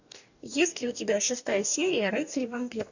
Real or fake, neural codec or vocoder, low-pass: fake; codec, 44.1 kHz, 2.6 kbps, DAC; 7.2 kHz